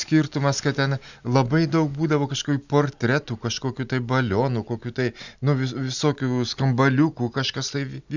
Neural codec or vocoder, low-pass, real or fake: none; 7.2 kHz; real